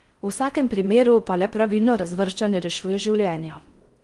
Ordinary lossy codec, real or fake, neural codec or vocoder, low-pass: Opus, 24 kbps; fake; codec, 16 kHz in and 24 kHz out, 0.6 kbps, FocalCodec, streaming, 4096 codes; 10.8 kHz